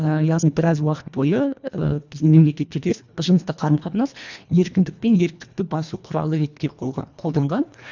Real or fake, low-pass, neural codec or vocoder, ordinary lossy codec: fake; 7.2 kHz; codec, 24 kHz, 1.5 kbps, HILCodec; none